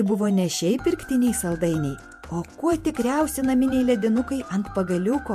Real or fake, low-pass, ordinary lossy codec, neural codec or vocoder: fake; 14.4 kHz; MP3, 64 kbps; vocoder, 48 kHz, 128 mel bands, Vocos